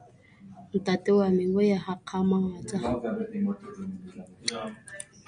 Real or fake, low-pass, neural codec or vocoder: real; 9.9 kHz; none